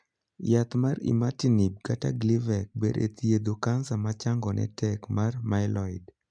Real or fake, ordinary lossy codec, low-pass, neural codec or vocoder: real; MP3, 96 kbps; 9.9 kHz; none